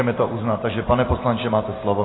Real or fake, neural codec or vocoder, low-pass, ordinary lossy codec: real; none; 7.2 kHz; AAC, 16 kbps